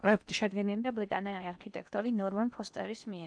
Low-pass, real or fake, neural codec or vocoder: 9.9 kHz; fake; codec, 16 kHz in and 24 kHz out, 0.8 kbps, FocalCodec, streaming, 65536 codes